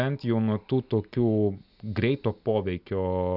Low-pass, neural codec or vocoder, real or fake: 5.4 kHz; none; real